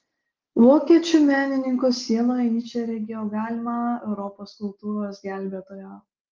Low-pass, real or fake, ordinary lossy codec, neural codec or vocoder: 7.2 kHz; real; Opus, 32 kbps; none